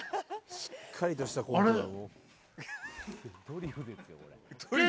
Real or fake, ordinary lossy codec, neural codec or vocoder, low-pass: real; none; none; none